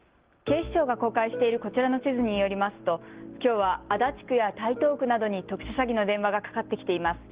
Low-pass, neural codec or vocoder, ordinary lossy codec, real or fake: 3.6 kHz; none; Opus, 16 kbps; real